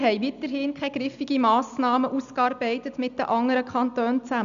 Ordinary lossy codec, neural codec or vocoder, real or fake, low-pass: none; none; real; 7.2 kHz